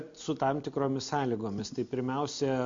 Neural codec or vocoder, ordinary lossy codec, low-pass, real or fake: none; MP3, 48 kbps; 7.2 kHz; real